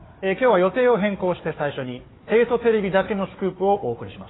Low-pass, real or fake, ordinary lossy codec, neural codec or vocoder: 7.2 kHz; fake; AAC, 16 kbps; codec, 16 kHz, 4 kbps, FunCodec, trained on Chinese and English, 50 frames a second